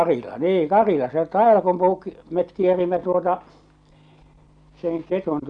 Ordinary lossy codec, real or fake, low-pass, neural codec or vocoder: Opus, 64 kbps; real; 9.9 kHz; none